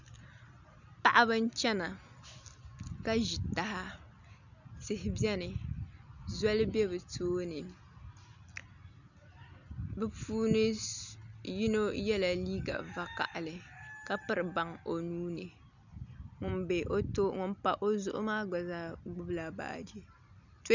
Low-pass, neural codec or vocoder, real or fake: 7.2 kHz; none; real